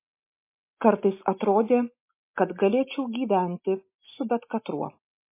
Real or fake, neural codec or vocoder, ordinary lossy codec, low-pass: real; none; MP3, 24 kbps; 3.6 kHz